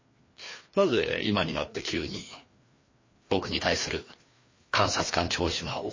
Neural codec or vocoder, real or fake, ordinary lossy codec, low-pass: codec, 16 kHz, 2 kbps, FreqCodec, larger model; fake; MP3, 32 kbps; 7.2 kHz